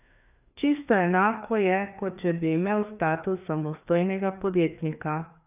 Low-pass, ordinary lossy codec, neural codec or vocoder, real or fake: 3.6 kHz; none; codec, 16 kHz, 2 kbps, FreqCodec, larger model; fake